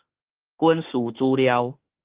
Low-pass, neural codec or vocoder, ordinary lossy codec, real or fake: 3.6 kHz; autoencoder, 48 kHz, 32 numbers a frame, DAC-VAE, trained on Japanese speech; Opus, 16 kbps; fake